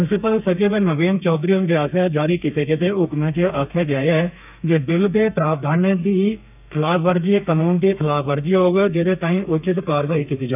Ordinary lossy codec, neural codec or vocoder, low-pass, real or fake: none; codec, 32 kHz, 1.9 kbps, SNAC; 3.6 kHz; fake